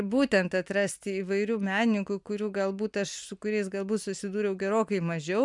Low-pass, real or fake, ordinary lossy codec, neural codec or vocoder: 10.8 kHz; real; MP3, 96 kbps; none